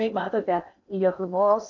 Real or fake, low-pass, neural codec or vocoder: fake; 7.2 kHz; codec, 16 kHz in and 24 kHz out, 0.6 kbps, FocalCodec, streaming, 4096 codes